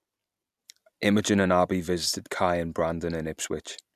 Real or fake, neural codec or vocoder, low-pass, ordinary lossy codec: real; none; 14.4 kHz; none